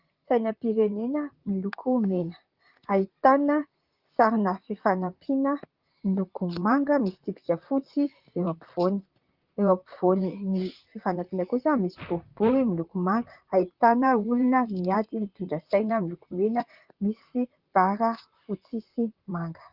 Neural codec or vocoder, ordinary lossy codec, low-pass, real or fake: vocoder, 44.1 kHz, 128 mel bands, Pupu-Vocoder; Opus, 24 kbps; 5.4 kHz; fake